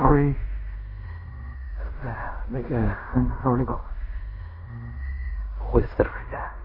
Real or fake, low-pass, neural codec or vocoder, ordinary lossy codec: fake; 5.4 kHz; codec, 16 kHz in and 24 kHz out, 0.4 kbps, LongCat-Audio-Codec, fine tuned four codebook decoder; MP3, 24 kbps